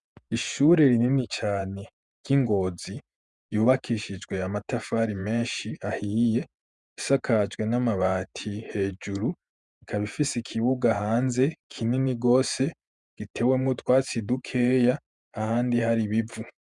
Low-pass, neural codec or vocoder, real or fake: 10.8 kHz; vocoder, 48 kHz, 128 mel bands, Vocos; fake